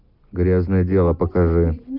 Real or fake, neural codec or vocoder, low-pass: real; none; 5.4 kHz